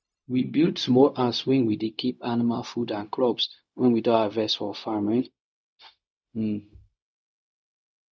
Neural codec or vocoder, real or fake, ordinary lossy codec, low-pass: codec, 16 kHz, 0.4 kbps, LongCat-Audio-Codec; fake; none; none